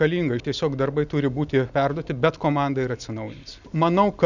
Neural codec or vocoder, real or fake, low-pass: none; real; 7.2 kHz